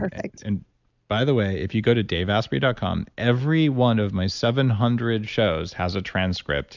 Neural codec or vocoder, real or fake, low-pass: none; real; 7.2 kHz